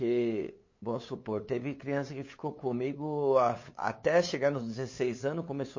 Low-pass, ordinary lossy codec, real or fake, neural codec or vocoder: 7.2 kHz; MP3, 32 kbps; fake; codec, 16 kHz, 8 kbps, FunCodec, trained on LibriTTS, 25 frames a second